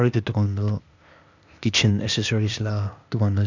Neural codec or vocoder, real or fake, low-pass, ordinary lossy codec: codec, 16 kHz, 0.8 kbps, ZipCodec; fake; 7.2 kHz; none